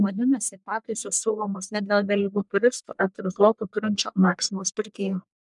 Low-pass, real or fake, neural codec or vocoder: 10.8 kHz; fake; codec, 44.1 kHz, 1.7 kbps, Pupu-Codec